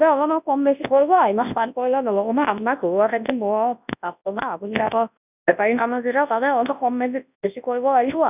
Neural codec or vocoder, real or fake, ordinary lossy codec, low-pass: codec, 24 kHz, 0.9 kbps, WavTokenizer, large speech release; fake; none; 3.6 kHz